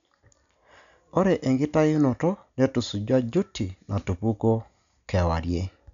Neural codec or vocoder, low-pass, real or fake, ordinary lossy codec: none; 7.2 kHz; real; none